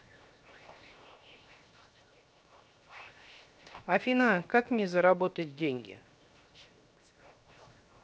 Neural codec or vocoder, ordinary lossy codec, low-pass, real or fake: codec, 16 kHz, 0.7 kbps, FocalCodec; none; none; fake